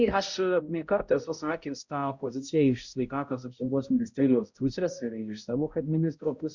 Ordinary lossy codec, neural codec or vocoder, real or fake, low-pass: Opus, 64 kbps; codec, 16 kHz, 0.5 kbps, X-Codec, HuBERT features, trained on balanced general audio; fake; 7.2 kHz